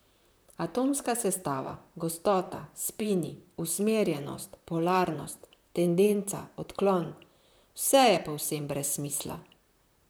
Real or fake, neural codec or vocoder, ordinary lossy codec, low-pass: fake; vocoder, 44.1 kHz, 128 mel bands, Pupu-Vocoder; none; none